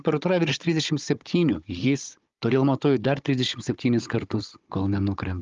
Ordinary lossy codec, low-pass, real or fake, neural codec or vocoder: Opus, 32 kbps; 7.2 kHz; fake; codec, 16 kHz, 16 kbps, FunCodec, trained on Chinese and English, 50 frames a second